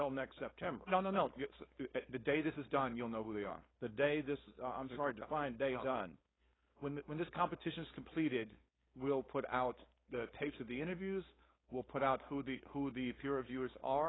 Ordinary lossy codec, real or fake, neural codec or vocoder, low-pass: AAC, 16 kbps; fake; codec, 16 kHz, 4.8 kbps, FACodec; 7.2 kHz